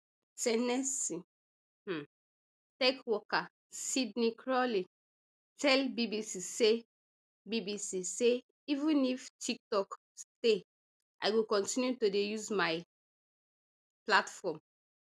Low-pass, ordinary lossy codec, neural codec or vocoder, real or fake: none; none; none; real